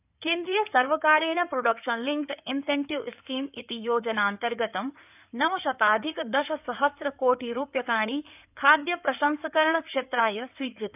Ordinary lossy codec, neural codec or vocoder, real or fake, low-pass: none; codec, 16 kHz in and 24 kHz out, 2.2 kbps, FireRedTTS-2 codec; fake; 3.6 kHz